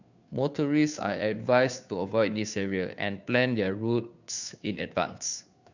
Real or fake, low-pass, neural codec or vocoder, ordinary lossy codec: fake; 7.2 kHz; codec, 16 kHz, 2 kbps, FunCodec, trained on Chinese and English, 25 frames a second; none